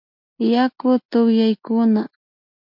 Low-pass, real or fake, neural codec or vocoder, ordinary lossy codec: 5.4 kHz; real; none; MP3, 48 kbps